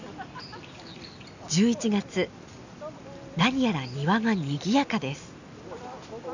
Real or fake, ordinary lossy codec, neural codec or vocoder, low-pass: real; none; none; 7.2 kHz